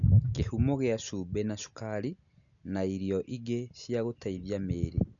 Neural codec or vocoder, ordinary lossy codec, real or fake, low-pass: none; none; real; 7.2 kHz